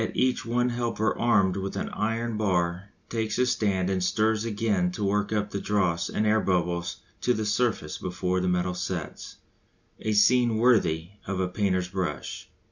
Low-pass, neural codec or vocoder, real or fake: 7.2 kHz; none; real